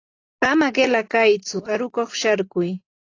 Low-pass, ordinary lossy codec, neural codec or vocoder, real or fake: 7.2 kHz; AAC, 32 kbps; none; real